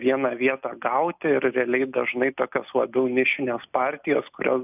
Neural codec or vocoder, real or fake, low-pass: none; real; 3.6 kHz